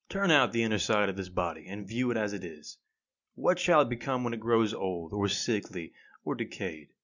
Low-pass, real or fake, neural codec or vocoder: 7.2 kHz; real; none